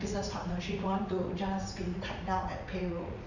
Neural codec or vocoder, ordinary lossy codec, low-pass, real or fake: vocoder, 44.1 kHz, 80 mel bands, Vocos; none; 7.2 kHz; fake